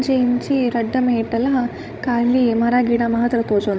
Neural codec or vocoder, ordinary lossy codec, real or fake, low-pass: codec, 16 kHz, 16 kbps, FreqCodec, larger model; none; fake; none